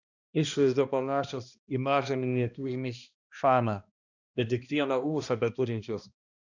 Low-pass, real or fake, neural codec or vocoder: 7.2 kHz; fake; codec, 16 kHz, 1 kbps, X-Codec, HuBERT features, trained on balanced general audio